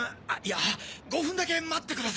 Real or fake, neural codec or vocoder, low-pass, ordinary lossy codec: real; none; none; none